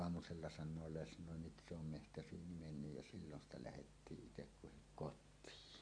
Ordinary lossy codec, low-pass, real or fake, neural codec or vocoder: MP3, 48 kbps; 9.9 kHz; real; none